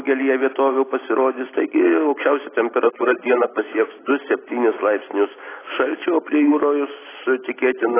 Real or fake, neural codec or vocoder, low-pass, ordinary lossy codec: real; none; 3.6 kHz; AAC, 16 kbps